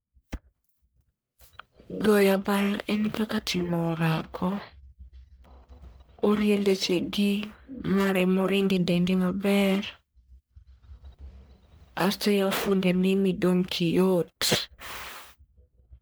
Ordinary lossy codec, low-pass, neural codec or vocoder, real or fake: none; none; codec, 44.1 kHz, 1.7 kbps, Pupu-Codec; fake